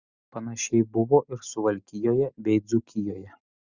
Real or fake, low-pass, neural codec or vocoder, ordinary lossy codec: real; 7.2 kHz; none; Opus, 64 kbps